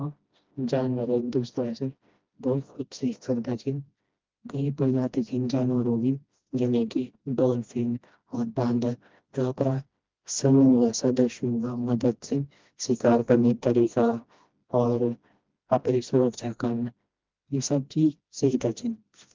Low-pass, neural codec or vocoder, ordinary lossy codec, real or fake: 7.2 kHz; codec, 16 kHz, 1 kbps, FreqCodec, smaller model; Opus, 24 kbps; fake